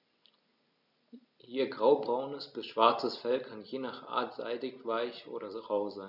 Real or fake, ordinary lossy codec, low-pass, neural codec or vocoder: real; none; 5.4 kHz; none